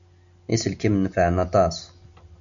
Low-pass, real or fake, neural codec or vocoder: 7.2 kHz; real; none